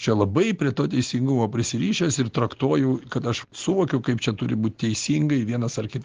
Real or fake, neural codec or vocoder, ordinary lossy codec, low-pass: real; none; Opus, 16 kbps; 7.2 kHz